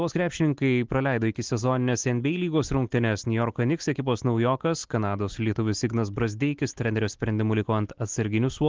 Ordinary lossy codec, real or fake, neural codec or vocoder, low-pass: Opus, 16 kbps; real; none; 7.2 kHz